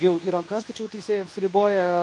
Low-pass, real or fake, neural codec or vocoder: 10.8 kHz; fake; codec, 24 kHz, 0.9 kbps, WavTokenizer, medium speech release version 2